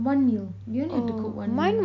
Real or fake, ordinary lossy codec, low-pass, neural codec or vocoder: real; MP3, 64 kbps; 7.2 kHz; none